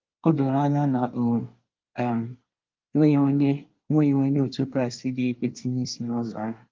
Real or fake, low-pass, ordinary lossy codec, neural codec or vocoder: fake; 7.2 kHz; Opus, 24 kbps; codec, 24 kHz, 1 kbps, SNAC